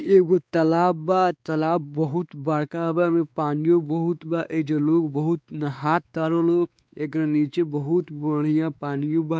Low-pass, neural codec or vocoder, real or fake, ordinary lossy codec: none; codec, 16 kHz, 2 kbps, X-Codec, WavLM features, trained on Multilingual LibriSpeech; fake; none